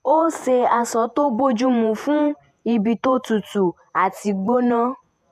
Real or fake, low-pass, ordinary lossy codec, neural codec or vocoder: fake; 14.4 kHz; AAC, 96 kbps; vocoder, 48 kHz, 128 mel bands, Vocos